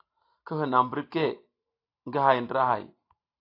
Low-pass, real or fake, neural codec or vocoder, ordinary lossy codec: 5.4 kHz; real; none; AAC, 32 kbps